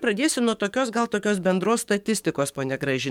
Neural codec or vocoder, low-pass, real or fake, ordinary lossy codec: codec, 44.1 kHz, 7.8 kbps, DAC; 19.8 kHz; fake; MP3, 96 kbps